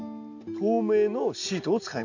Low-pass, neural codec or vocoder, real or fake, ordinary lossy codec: 7.2 kHz; none; real; none